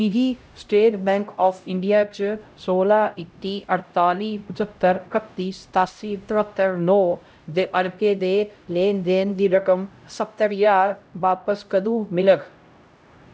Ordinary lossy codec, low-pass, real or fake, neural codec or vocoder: none; none; fake; codec, 16 kHz, 0.5 kbps, X-Codec, HuBERT features, trained on LibriSpeech